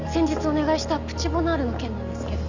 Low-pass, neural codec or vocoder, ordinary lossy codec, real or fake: 7.2 kHz; none; none; real